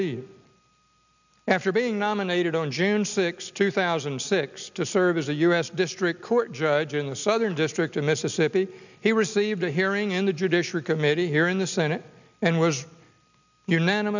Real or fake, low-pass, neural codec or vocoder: real; 7.2 kHz; none